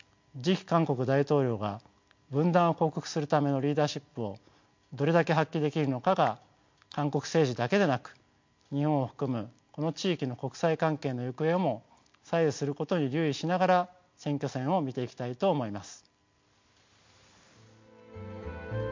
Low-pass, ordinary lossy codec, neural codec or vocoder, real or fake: 7.2 kHz; MP3, 48 kbps; none; real